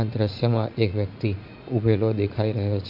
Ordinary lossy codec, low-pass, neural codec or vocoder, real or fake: none; 5.4 kHz; vocoder, 44.1 kHz, 80 mel bands, Vocos; fake